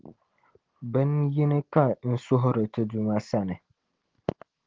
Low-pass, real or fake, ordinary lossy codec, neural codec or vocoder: 7.2 kHz; real; Opus, 16 kbps; none